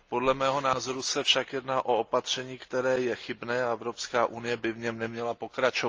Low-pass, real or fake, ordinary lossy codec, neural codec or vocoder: 7.2 kHz; real; Opus, 24 kbps; none